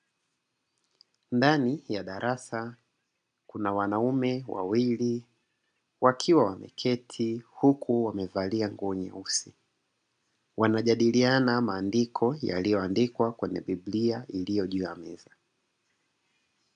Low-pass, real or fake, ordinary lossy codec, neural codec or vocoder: 9.9 kHz; real; AAC, 96 kbps; none